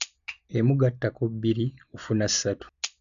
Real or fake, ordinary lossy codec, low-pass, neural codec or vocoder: real; none; 7.2 kHz; none